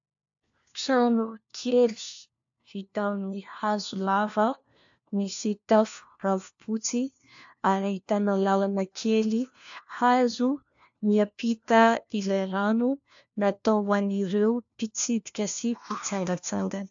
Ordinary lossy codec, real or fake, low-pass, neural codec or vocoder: AAC, 64 kbps; fake; 7.2 kHz; codec, 16 kHz, 1 kbps, FunCodec, trained on LibriTTS, 50 frames a second